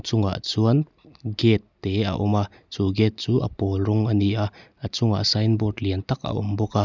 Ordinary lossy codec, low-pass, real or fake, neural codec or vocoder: none; 7.2 kHz; real; none